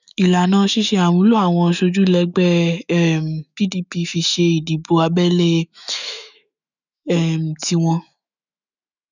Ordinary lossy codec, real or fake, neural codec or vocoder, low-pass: none; fake; autoencoder, 48 kHz, 128 numbers a frame, DAC-VAE, trained on Japanese speech; 7.2 kHz